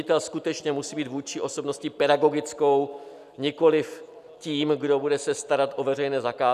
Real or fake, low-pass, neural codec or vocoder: real; 14.4 kHz; none